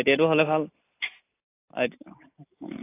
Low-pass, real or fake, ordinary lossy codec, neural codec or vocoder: 3.6 kHz; real; AAC, 24 kbps; none